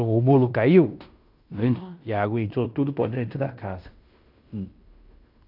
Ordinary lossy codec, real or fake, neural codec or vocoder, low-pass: none; fake; codec, 16 kHz in and 24 kHz out, 0.9 kbps, LongCat-Audio-Codec, fine tuned four codebook decoder; 5.4 kHz